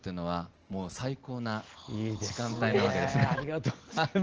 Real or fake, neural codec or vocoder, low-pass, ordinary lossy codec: real; none; 7.2 kHz; Opus, 16 kbps